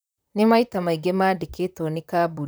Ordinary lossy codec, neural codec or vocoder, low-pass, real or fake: none; vocoder, 44.1 kHz, 128 mel bands, Pupu-Vocoder; none; fake